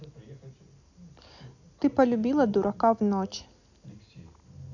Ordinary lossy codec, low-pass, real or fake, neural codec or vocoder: none; 7.2 kHz; real; none